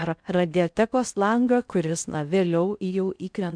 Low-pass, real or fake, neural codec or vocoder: 9.9 kHz; fake; codec, 16 kHz in and 24 kHz out, 0.6 kbps, FocalCodec, streaming, 4096 codes